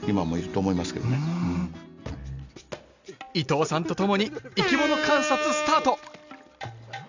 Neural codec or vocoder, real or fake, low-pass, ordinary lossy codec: none; real; 7.2 kHz; none